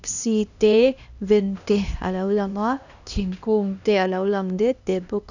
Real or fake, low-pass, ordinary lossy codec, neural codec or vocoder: fake; 7.2 kHz; none; codec, 16 kHz, 1 kbps, X-Codec, HuBERT features, trained on LibriSpeech